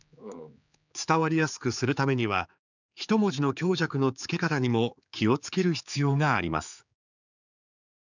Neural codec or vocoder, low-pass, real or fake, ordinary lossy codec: codec, 16 kHz, 4 kbps, X-Codec, HuBERT features, trained on general audio; 7.2 kHz; fake; none